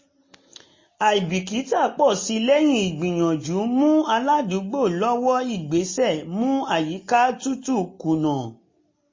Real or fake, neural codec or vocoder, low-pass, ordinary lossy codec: real; none; 7.2 kHz; MP3, 32 kbps